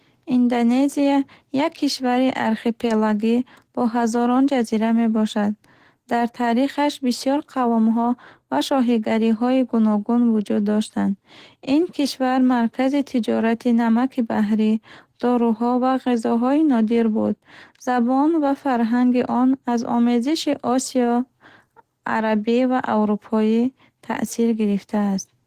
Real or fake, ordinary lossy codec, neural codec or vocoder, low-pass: real; Opus, 16 kbps; none; 14.4 kHz